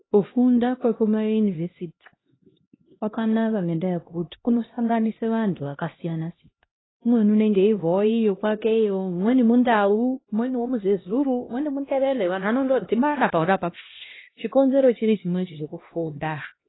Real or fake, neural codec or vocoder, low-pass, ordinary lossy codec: fake; codec, 16 kHz, 1 kbps, X-Codec, HuBERT features, trained on LibriSpeech; 7.2 kHz; AAC, 16 kbps